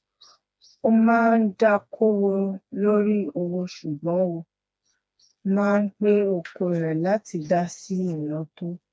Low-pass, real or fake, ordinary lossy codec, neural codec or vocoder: none; fake; none; codec, 16 kHz, 2 kbps, FreqCodec, smaller model